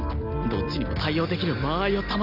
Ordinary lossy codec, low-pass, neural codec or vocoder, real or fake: none; 5.4 kHz; none; real